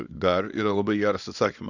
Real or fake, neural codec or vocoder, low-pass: fake; codec, 24 kHz, 0.9 kbps, WavTokenizer, small release; 7.2 kHz